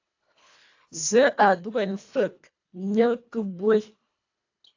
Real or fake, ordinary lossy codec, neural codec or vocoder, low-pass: fake; AAC, 48 kbps; codec, 24 kHz, 1.5 kbps, HILCodec; 7.2 kHz